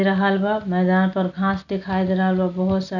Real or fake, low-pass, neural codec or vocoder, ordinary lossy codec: real; 7.2 kHz; none; none